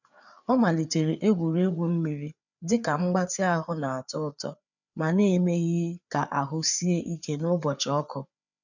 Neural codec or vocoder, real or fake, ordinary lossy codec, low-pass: codec, 16 kHz, 4 kbps, FreqCodec, larger model; fake; none; 7.2 kHz